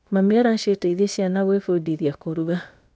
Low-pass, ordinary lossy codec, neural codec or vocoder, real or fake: none; none; codec, 16 kHz, about 1 kbps, DyCAST, with the encoder's durations; fake